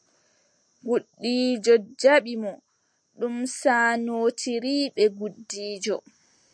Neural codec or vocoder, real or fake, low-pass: none; real; 9.9 kHz